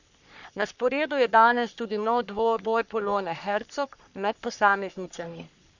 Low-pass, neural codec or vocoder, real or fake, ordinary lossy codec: 7.2 kHz; codec, 44.1 kHz, 3.4 kbps, Pupu-Codec; fake; none